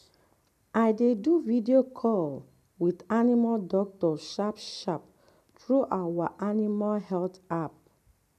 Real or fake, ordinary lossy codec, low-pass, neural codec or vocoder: real; none; 14.4 kHz; none